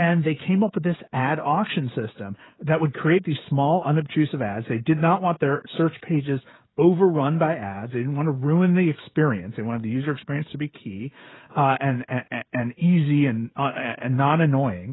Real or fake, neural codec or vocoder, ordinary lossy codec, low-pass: fake; codec, 16 kHz, 16 kbps, FreqCodec, smaller model; AAC, 16 kbps; 7.2 kHz